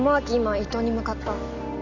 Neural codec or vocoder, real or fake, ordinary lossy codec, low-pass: none; real; none; 7.2 kHz